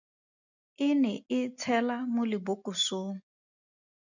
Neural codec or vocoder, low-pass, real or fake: none; 7.2 kHz; real